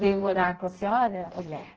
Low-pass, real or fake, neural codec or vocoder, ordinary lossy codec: 7.2 kHz; fake; codec, 16 kHz in and 24 kHz out, 0.6 kbps, FireRedTTS-2 codec; Opus, 16 kbps